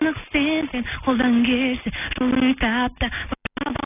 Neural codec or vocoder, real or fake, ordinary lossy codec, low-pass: none; real; none; 3.6 kHz